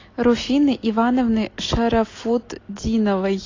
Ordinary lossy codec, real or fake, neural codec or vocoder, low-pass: AAC, 32 kbps; real; none; 7.2 kHz